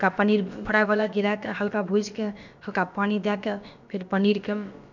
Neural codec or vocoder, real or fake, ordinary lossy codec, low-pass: codec, 16 kHz, about 1 kbps, DyCAST, with the encoder's durations; fake; none; 7.2 kHz